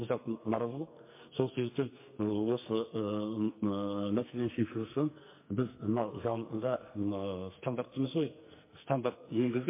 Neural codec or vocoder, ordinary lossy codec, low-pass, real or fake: codec, 44.1 kHz, 2.6 kbps, SNAC; MP3, 24 kbps; 3.6 kHz; fake